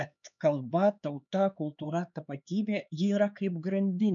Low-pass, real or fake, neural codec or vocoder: 7.2 kHz; fake; codec, 16 kHz, 4 kbps, X-Codec, HuBERT features, trained on LibriSpeech